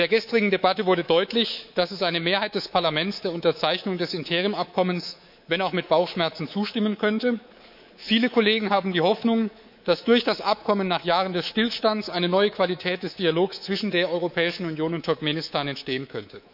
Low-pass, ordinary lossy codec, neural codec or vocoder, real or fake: 5.4 kHz; none; codec, 24 kHz, 3.1 kbps, DualCodec; fake